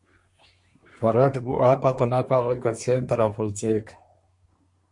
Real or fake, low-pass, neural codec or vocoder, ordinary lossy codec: fake; 10.8 kHz; codec, 24 kHz, 1 kbps, SNAC; MP3, 48 kbps